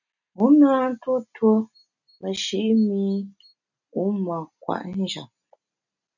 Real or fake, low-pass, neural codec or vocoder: real; 7.2 kHz; none